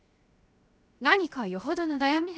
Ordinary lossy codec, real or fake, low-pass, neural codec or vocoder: none; fake; none; codec, 16 kHz, 0.7 kbps, FocalCodec